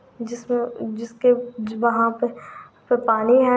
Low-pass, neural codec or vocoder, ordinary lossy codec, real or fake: none; none; none; real